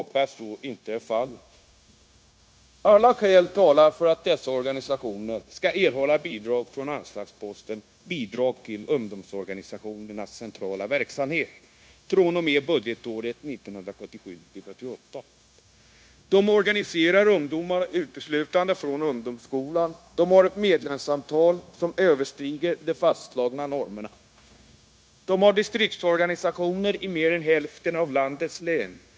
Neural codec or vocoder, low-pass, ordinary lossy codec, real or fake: codec, 16 kHz, 0.9 kbps, LongCat-Audio-Codec; none; none; fake